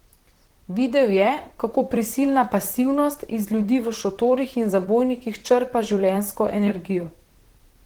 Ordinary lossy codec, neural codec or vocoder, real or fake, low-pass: Opus, 16 kbps; vocoder, 44.1 kHz, 128 mel bands, Pupu-Vocoder; fake; 19.8 kHz